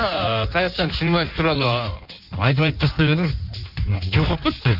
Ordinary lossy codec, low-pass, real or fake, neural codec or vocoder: none; 5.4 kHz; fake; codec, 16 kHz in and 24 kHz out, 1.1 kbps, FireRedTTS-2 codec